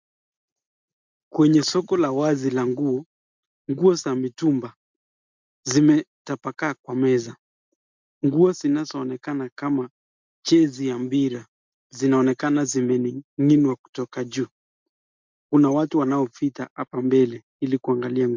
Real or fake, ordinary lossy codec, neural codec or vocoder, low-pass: real; MP3, 64 kbps; none; 7.2 kHz